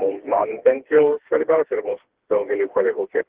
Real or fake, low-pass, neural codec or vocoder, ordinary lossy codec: fake; 3.6 kHz; codec, 16 kHz, 2 kbps, FreqCodec, smaller model; Opus, 16 kbps